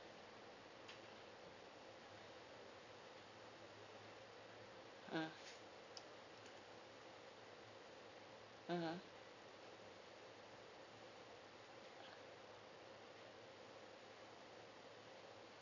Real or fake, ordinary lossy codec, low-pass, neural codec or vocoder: real; none; 7.2 kHz; none